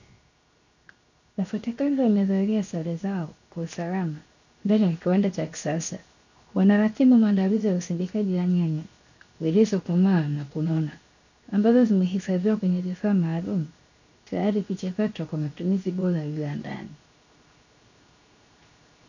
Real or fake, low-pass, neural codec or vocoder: fake; 7.2 kHz; codec, 16 kHz, 0.7 kbps, FocalCodec